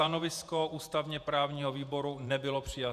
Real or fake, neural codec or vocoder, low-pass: fake; vocoder, 48 kHz, 128 mel bands, Vocos; 14.4 kHz